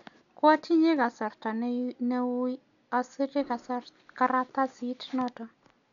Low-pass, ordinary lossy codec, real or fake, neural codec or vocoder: 7.2 kHz; none; real; none